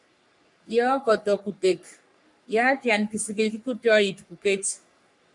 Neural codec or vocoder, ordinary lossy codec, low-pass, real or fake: codec, 44.1 kHz, 3.4 kbps, Pupu-Codec; MP3, 96 kbps; 10.8 kHz; fake